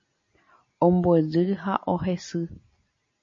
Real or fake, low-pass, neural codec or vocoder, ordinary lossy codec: real; 7.2 kHz; none; MP3, 32 kbps